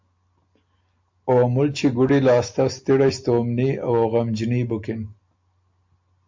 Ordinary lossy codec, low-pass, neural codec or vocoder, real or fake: MP3, 48 kbps; 7.2 kHz; none; real